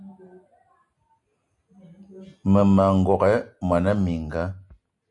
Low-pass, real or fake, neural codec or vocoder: 10.8 kHz; real; none